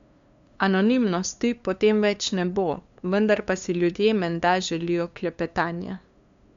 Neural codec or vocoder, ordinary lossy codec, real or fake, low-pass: codec, 16 kHz, 2 kbps, FunCodec, trained on LibriTTS, 25 frames a second; MP3, 64 kbps; fake; 7.2 kHz